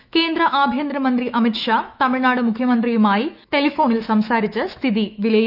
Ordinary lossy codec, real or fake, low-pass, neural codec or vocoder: none; fake; 5.4 kHz; autoencoder, 48 kHz, 128 numbers a frame, DAC-VAE, trained on Japanese speech